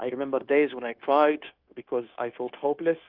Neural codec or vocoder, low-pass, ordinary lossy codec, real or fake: codec, 16 kHz, 0.9 kbps, LongCat-Audio-Codec; 5.4 kHz; Opus, 24 kbps; fake